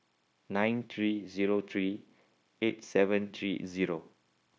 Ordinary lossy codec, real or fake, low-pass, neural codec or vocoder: none; fake; none; codec, 16 kHz, 0.9 kbps, LongCat-Audio-Codec